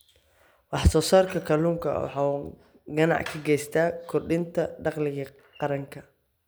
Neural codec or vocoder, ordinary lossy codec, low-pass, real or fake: none; none; none; real